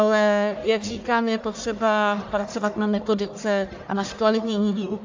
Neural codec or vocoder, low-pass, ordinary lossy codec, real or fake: codec, 44.1 kHz, 1.7 kbps, Pupu-Codec; 7.2 kHz; AAC, 48 kbps; fake